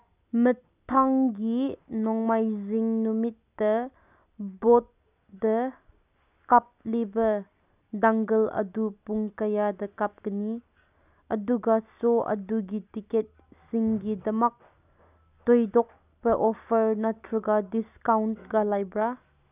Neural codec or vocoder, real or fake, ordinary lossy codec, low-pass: none; real; none; 3.6 kHz